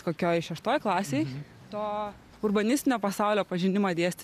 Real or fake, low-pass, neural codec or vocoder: real; 14.4 kHz; none